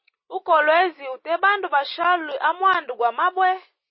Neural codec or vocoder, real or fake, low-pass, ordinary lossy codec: none; real; 7.2 kHz; MP3, 24 kbps